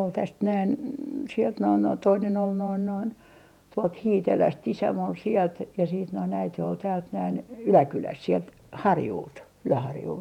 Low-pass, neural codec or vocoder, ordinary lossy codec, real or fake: 19.8 kHz; vocoder, 48 kHz, 128 mel bands, Vocos; none; fake